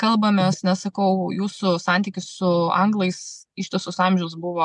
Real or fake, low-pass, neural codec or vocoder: real; 10.8 kHz; none